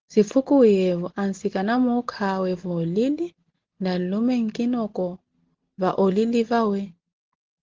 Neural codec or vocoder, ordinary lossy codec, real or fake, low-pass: none; Opus, 16 kbps; real; 7.2 kHz